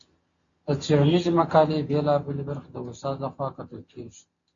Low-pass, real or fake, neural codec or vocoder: 7.2 kHz; real; none